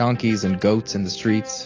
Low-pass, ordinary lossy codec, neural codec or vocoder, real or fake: 7.2 kHz; AAC, 32 kbps; none; real